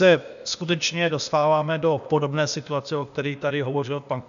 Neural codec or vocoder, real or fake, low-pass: codec, 16 kHz, 0.8 kbps, ZipCodec; fake; 7.2 kHz